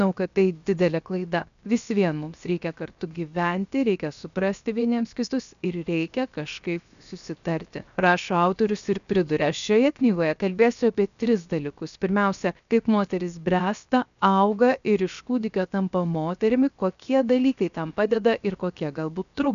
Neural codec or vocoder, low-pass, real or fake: codec, 16 kHz, 0.7 kbps, FocalCodec; 7.2 kHz; fake